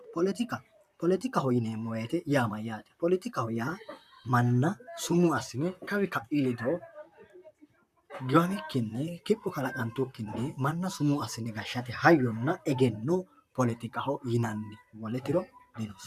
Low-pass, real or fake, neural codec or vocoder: 14.4 kHz; fake; vocoder, 44.1 kHz, 128 mel bands, Pupu-Vocoder